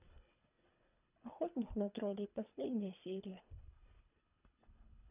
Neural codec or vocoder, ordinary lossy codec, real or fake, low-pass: codec, 24 kHz, 3 kbps, HILCodec; none; fake; 3.6 kHz